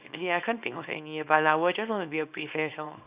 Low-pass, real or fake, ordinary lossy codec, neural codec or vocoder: 3.6 kHz; fake; Opus, 64 kbps; codec, 24 kHz, 0.9 kbps, WavTokenizer, small release